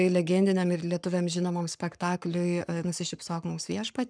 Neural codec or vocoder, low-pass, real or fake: codec, 44.1 kHz, 7.8 kbps, Pupu-Codec; 9.9 kHz; fake